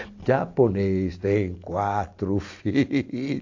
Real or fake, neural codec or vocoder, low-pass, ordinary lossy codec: real; none; 7.2 kHz; AAC, 48 kbps